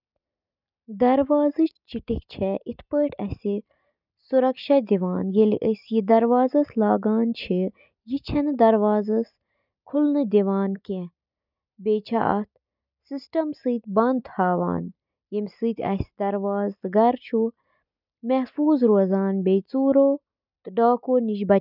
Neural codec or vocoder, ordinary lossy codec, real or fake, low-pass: none; none; real; 5.4 kHz